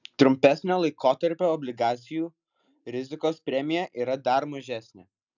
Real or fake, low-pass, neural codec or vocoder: real; 7.2 kHz; none